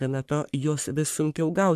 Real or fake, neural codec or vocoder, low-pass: fake; codec, 44.1 kHz, 3.4 kbps, Pupu-Codec; 14.4 kHz